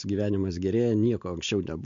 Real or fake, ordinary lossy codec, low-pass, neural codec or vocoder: real; MP3, 48 kbps; 7.2 kHz; none